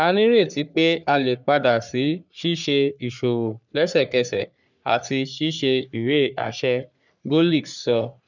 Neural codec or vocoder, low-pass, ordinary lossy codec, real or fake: codec, 44.1 kHz, 3.4 kbps, Pupu-Codec; 7.2 kHz; none; fake